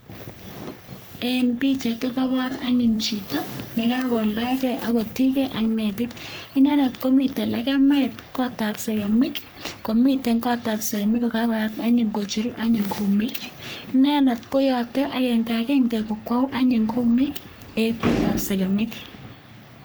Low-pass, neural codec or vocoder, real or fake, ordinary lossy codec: none; codec, 44.1 kHz, 3.4 kbps, Pupu-Codec; fake; none